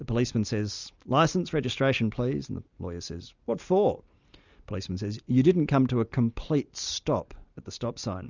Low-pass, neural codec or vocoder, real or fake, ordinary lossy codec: 7.2 kHz; none; real; Opus, 64 kbps